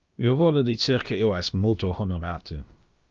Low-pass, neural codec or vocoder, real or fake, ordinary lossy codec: 7.2 kHz; codec, 16 kHz, about 1 kbps, DyCAST, with the encoder's durations; fake; Opus, 24 kbps